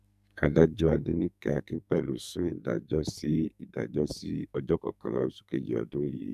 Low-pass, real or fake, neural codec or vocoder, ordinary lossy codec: 14.4 kHz; fake; codec, 44.1 kHz, 2.6 kbps, SNAC; none